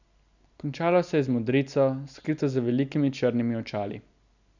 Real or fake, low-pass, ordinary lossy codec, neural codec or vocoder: real; 7.2 kHz; none; none